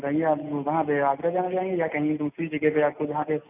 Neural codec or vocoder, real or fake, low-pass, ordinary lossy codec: none; real; 3.6 kHz; none